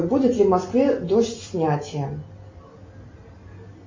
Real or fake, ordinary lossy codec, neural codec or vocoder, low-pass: real; MP3, 32 kbps; none; 7.2 kHz